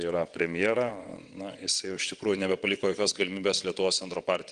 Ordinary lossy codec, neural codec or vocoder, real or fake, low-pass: Opus, 24 kbps; vocoder, 22.05 kHz, 80 mel bands, WaveNeXt; fake; 9.9 kHz